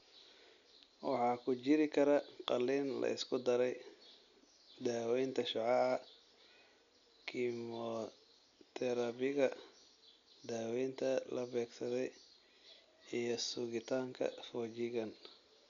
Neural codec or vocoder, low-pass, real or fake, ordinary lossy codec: none; 7.2 kHz; real; none